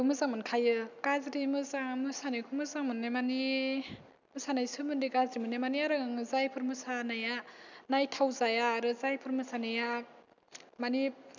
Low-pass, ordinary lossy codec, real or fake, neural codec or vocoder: 7.2 kHz; none; real; none